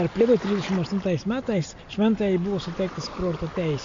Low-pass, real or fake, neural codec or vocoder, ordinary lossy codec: 7.2 kHz; real; none; MP3, 48 kbps